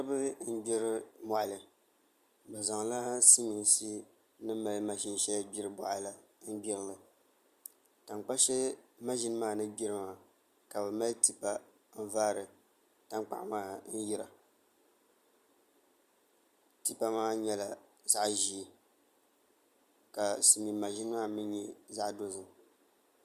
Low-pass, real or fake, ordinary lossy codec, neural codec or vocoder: 14.4 kHz; real; Opus, 64 kbps; none